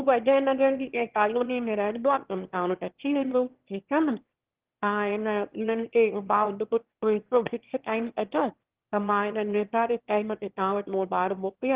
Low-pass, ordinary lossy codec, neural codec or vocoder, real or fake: 3.6 kHz; Opus, 16 kbps; autoencoder, 22.05 kHz, a latent of 192 numbers a frame, VITS, trained on one speaker; fake